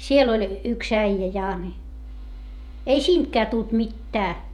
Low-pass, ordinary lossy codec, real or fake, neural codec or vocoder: 19.8 kHz; none; fake; vocoder, 48 kHz, 128 mel bands, Vocos